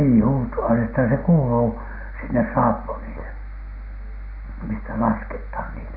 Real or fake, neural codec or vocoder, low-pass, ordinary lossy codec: real; none; 5.4 kHz; none